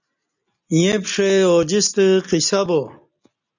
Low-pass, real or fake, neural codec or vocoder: 7.2 kHz; real; none